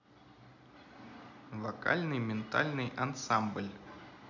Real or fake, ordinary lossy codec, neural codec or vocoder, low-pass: real; none; none; 7.2 kHz